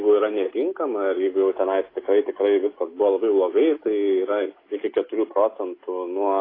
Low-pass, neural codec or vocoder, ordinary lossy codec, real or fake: 5.4 kHz; none; AAC, 24 kbps; real